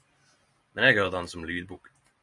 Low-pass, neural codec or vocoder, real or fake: 10.8 kHz; none; real